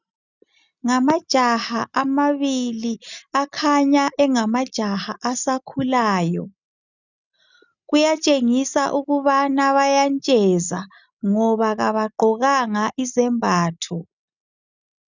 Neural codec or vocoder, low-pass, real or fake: none; 7.2 kHz; real